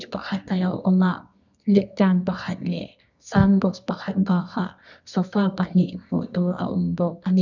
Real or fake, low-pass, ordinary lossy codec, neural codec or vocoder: fake; 7.2 kHz; none; codec, 24 kHz, 0.9 kbps, WavTokenizer, medium music audio release